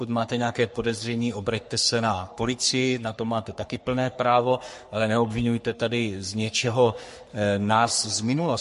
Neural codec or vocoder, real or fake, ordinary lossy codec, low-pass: codec, 44.1 kHz, 3.4 kbps, Pupu-Codec; fake; MP3, 48 kbps; 14.4 kHz